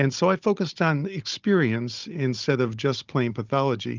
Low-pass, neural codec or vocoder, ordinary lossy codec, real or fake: 7.2 kHz; codec, 16 kHz, 16 kbps, FunCodec, trained on Chinese and English, 50 frames a second; Opus, 24 kbps; fake